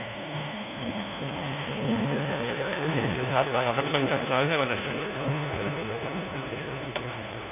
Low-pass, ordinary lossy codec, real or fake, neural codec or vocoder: 3.6 kHz; none; fake; codec, 16 kHz, 1 kbps, FunCodec, trained on LibriTTS, 50 frames a second